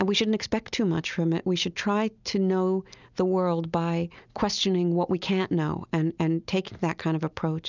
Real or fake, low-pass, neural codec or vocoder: real; 7.2 kHz; none